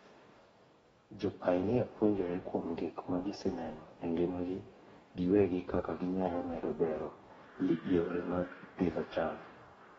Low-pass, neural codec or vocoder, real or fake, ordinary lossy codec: 19.8 kHz; codec, 44.1 kHz, 2.6 kbps, DAC; fake; AAC, 24 kbps